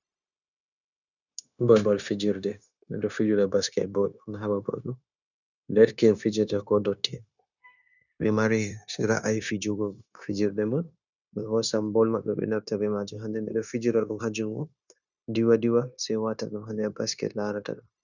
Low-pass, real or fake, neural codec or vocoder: 7.2 kHz; fake; codec, 16 kHz, 0.9 kbps, LongCat-Audio-Codec